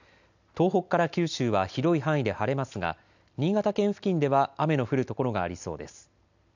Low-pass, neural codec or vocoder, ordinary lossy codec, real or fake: 7.2 kHz; none; none; real